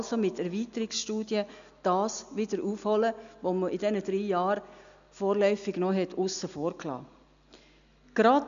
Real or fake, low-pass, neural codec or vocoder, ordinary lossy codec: real; 7.2 kHz; none; none